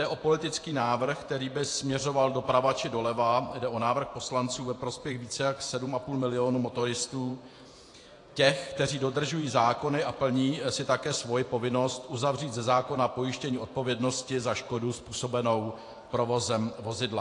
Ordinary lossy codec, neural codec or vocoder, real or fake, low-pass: AAC, 48 kbps; vocoder, 48 kHz, 128 mel bands, Vocos; fake; 10.8 kHz